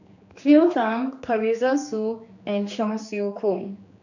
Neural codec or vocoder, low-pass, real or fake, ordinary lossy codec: codec, 16 kHz, 2 kbps, X-Codec, HuBERT features, trained on general audio; 7.2 kHz; fake; none